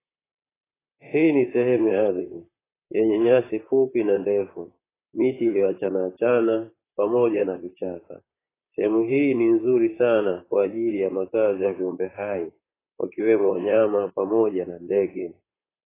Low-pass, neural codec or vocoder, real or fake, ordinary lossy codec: 3.6 kHz; vocoder, 44.1 kHz, 128 mel bands, Pupu-Vocoder; fake; AAC, 16 kbps